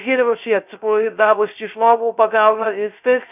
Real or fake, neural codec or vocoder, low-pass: fake; codec, 16 kHz, 0.3 kbps, FocalCodec; 3.6 kHz